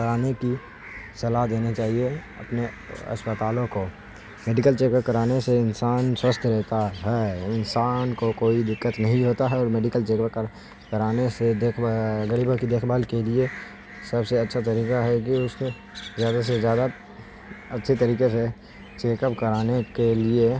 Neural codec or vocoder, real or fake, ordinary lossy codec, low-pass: none; real; none; none